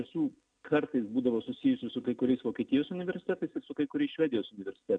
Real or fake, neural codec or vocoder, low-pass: real; none; 9.9 kHz